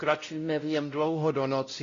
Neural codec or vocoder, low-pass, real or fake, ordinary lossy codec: codec, 16 kHz, 0.5 kbps, X-Codec, WavLM features, trained on Multilingual LibriSpeech; 7.2 kHz; fake; AAC, 32 kbps